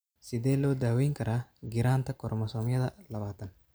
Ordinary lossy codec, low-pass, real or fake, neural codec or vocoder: none; none; real; none